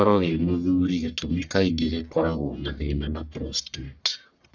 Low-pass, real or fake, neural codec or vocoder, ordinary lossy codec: 7.2 kHz; fake; codec, 44.1 kHz, 1.7 kbps, Pupu-Codec; none